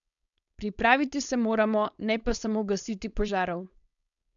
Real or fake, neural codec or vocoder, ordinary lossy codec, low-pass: fake; codec, 16 kHz, 4.8 kbps, FACodec; none; 7.2 kHz